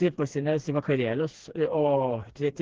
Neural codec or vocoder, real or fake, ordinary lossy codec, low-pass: codec, 16 kHz, 2 kbps, FreqCodec, smaller model; fake; Opus, 16 kbps; 7.2 kHz